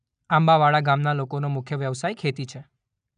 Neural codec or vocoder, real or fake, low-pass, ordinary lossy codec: none; real; 9.9 kHz; none